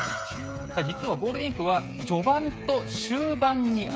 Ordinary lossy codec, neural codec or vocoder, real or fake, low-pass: none; codec, 16 kHz, 8 kbps, FreqCodec, smaller model; fake; none